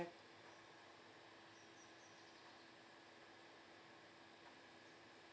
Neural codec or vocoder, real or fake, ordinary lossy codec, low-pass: none; real; none; none